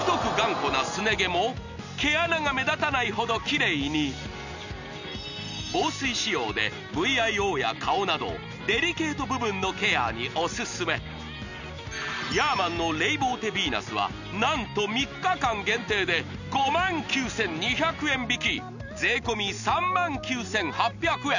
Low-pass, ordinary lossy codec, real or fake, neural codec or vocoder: 7.2 kHz; none; real; none